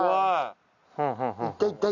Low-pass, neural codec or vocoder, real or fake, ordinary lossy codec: 7.2 kHz; none; real; none